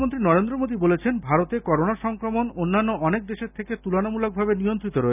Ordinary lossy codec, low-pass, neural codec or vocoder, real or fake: none; 3.6 kHz; none; real